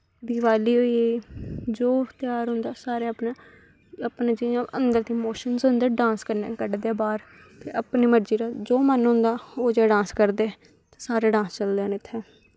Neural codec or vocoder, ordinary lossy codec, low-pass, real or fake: none; none; none; real